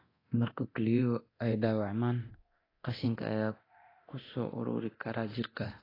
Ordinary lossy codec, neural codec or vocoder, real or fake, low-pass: AAC, 24 kbps; codec, 24 kHz, 0.9 kbps, DualCodec; fake; 5.4 kHz